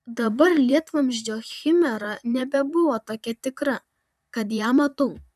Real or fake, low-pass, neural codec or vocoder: fake; 14.4 kHz; vocoder, 44.1 kHz, 128 mel bands, Pupu-Vocoder